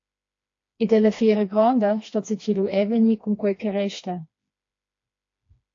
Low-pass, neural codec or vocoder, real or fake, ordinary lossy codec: 7.2 kHz; codec, 16 kHz, 2 kbps, FreqCodec, smaller model; fake; AAC, 48 kbps